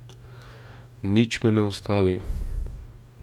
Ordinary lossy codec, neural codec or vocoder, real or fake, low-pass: none; codec, 44.1 kHz, 2.6 kbps, DAC; fake; 19.8 kHz